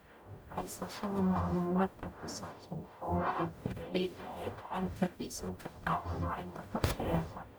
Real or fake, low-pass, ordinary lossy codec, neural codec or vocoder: fake; none; none; codec, 44.1 kHz, 0.9 kbps, DAC